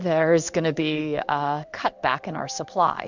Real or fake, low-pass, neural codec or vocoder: fake; 7.2 kHz; codec, 16 kHz in and 24 kHz out, 1 kbps, XY-Tokenizer